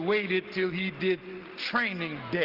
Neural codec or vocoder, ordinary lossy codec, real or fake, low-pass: none; Opus, 16 kbps; real; 5.4 kHz